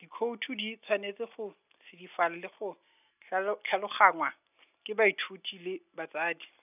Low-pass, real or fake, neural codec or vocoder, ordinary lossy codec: 3.6 kHz; real; none; none